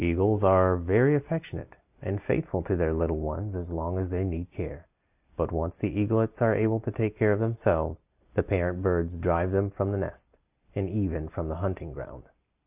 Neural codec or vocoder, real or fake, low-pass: none; real; 3.6 kHz